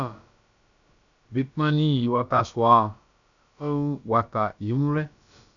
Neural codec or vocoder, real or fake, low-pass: codec, 16 kHz, about 1 kbps, DyCAST, with the encoder's durations; fake; 7.2 kHz